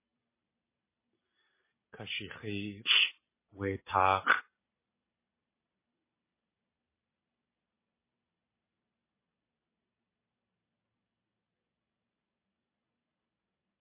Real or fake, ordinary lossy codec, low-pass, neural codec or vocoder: real; MP3, 16 kbps; 3.6 kHz; none